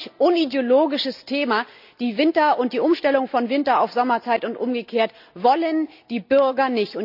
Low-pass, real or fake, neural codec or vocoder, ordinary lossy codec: 5.4 kHz; real; none; none